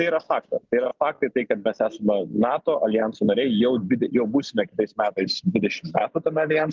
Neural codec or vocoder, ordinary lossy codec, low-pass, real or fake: none; Opus, 32 kbps; 7.2 kHz; real